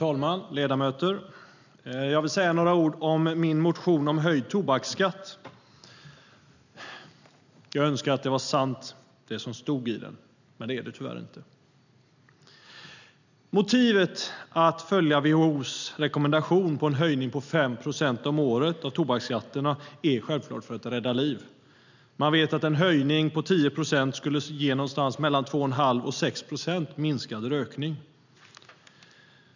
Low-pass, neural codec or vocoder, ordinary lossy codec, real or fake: 7.2 kHz; none; none; real